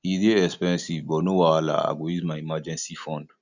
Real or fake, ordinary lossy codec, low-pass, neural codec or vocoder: real; none; 7.2 kHz; none